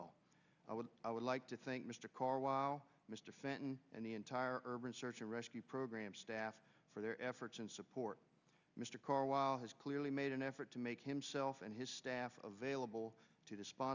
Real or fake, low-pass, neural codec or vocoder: real; 7.2 kHz; none